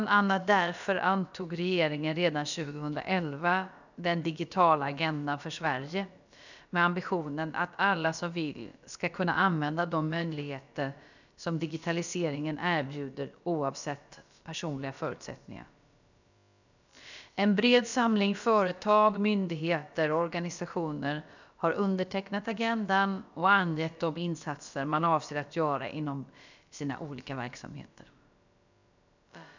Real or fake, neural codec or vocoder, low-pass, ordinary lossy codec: fake; codec, 16 kHz, about 1 kbps, DyCAST, with the encoder's durations; 7.2 kHz; none